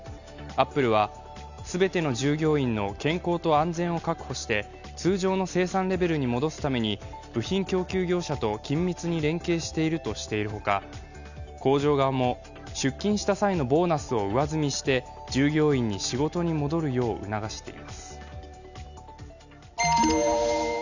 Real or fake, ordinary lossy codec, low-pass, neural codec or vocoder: real; none; 7.2 kHz; none